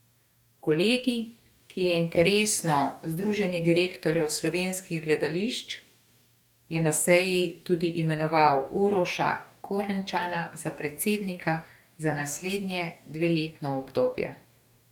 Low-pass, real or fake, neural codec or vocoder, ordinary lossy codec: 19.8 kHz; fake; codec, 44.1 kHz, 2.6 kbps, DAC; none